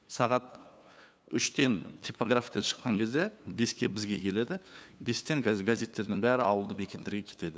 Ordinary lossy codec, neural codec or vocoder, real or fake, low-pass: none; codec, 16 kHz, 2 kbps, FunCodec, trained on LibriTTS, 25 frames a second; fake; none